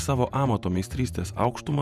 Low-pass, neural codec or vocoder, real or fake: 14.4 kHz; vocoder, 48 kHz, 128 mel bands, Vocos; fake